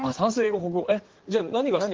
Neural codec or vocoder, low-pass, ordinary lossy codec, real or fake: codec, 16 kHz in and 24 kHz out, 2.2 kbps, FireRedTTS-2 codec; 7.2 kHz; Opus, 16 kbps; fake